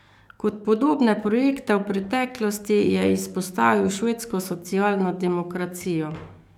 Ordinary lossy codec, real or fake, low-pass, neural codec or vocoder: none; fake; 19.8 kHz; codec, 44.1 kHz, 7.8 kbps, DAC